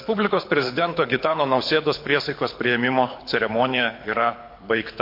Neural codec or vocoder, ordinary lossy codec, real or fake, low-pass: codec, 24 kHz, 6 kbps, HILCodec; MP3, 32 kbps; fake; 5.4 kHz